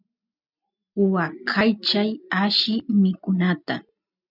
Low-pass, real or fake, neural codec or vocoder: 5.4 kHz; real; none